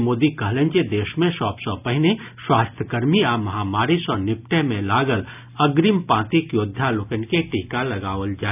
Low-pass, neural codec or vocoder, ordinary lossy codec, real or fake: 3.6 kHz; none; none; real